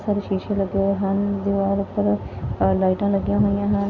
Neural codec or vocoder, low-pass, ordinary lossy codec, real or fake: none; 7.2 kHz; none; real